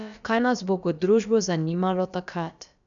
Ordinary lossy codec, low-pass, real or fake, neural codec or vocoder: none; 7.2 kHz; fake; codec, 16 kHz, about 1 kbps, DyCAST, with the encoder's durations